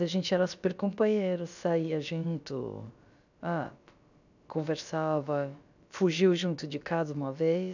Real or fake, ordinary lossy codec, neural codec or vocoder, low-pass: fake; none; codec, 16 kHz, about 1 kbps, DyCAST, with the encoder's durations; 7.2 kHz